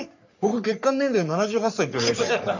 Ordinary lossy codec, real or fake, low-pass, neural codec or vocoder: none; fake; 7.2 kHz; codec, 44.1 kHz, 3.4 kbps, Pupu-Codec